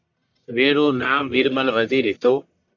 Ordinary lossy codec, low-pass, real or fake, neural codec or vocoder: AAC, 48 kbps; 7.2 kHz; fake; codec, 44.1 kHz, 1.7 kbps, Pupu-Codec